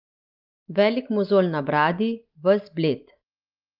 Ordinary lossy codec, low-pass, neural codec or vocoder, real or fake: Opus, 24 kbps; 5.4 kHz; none; real